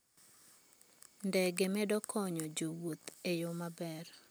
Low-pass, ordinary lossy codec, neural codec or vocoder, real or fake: none; none; none; real